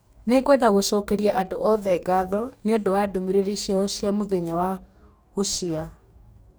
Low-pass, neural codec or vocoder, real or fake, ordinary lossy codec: none; codec, 44.1 kHz, 2.6 kbps, DAC; fake; none